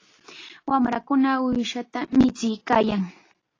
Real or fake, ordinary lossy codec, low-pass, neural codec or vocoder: real; AAC, 32 kbps; 7.2 kHz; none